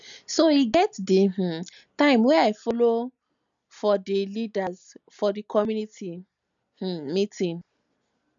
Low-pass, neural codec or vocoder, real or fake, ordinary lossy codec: 7.2 kHz; none; real; none